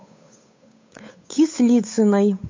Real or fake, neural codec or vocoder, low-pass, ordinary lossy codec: fake; codec, 16 kHz, 8 kbps, FunCodec, trained on Chinese and English, 25 frames a second; 7.2 kHz; MP3, 48 kbps